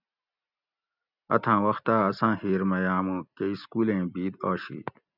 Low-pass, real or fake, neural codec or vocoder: 5.4 kHz; real; none